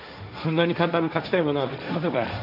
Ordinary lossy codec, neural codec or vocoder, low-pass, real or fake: none; codec, 16 kHz, 1.1 kbps, Voila-Tokenizer; 5.4 kHz; fake